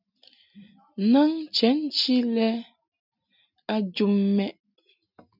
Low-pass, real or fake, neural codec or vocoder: 5.4 kHz; real; none